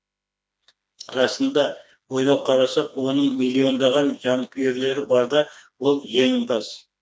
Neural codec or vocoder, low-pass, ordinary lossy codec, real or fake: codec, 16 kHz, 2 kbps, FreqCodec, smaller model; none; none; fake